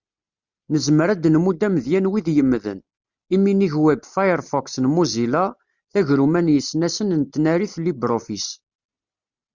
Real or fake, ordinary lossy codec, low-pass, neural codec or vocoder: real; Opus, 64 kbps; 7.2 kHz; none